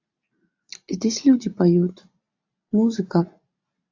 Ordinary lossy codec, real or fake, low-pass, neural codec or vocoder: AAC, 48 kbps; real; 7.2 kHz; none